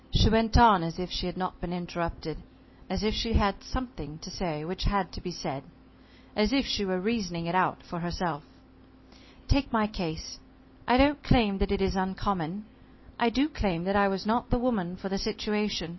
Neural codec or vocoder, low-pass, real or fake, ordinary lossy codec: none; 7.2 kHz; real; MP3, 24 kbps